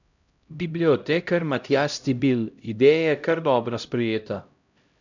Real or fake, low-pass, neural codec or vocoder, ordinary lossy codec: fake; 7.2 kHz; codec, 16 kHz, 0.5 kbps, X-Codec, HuBERT features, trained on LibriSpeech; none